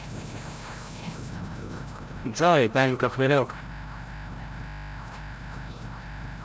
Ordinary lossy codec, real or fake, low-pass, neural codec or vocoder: none; fake; none; codec, 16 kHz, 0.5 kbps, FreqCodec, larger model